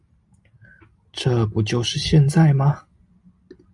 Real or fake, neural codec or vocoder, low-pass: real; none; 10.8 kHz